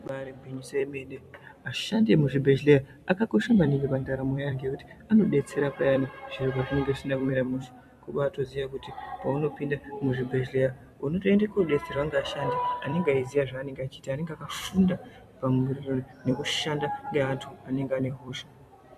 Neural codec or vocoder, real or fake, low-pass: vocoder, 44.1 kHz, 128 mel bands every 256 samples, BigVGAN v2; fake; 14.4 kHz